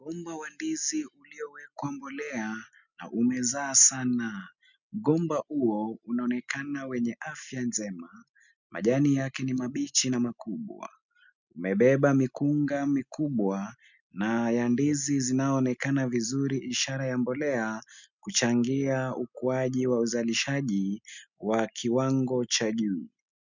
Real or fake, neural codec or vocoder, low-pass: real; none; 7.2 kHz